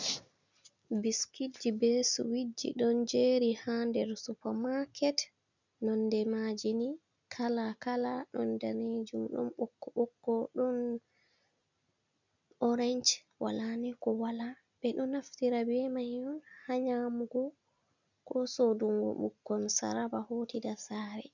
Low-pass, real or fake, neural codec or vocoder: 7.2 kHz; real; none